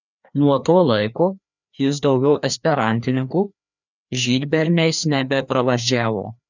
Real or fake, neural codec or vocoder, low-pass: fake; codec, 16 kHz, 2 kbps, FreqCodec, larger model; 7.2 kHz